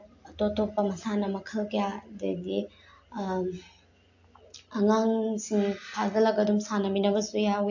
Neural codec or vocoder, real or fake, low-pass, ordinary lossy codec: none; real; 7.2 kHz; AAC, 48 kbps